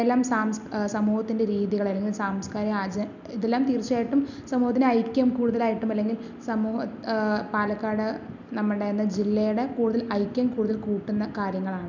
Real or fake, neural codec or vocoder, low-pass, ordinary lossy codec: real; none; 7.2 kHz; none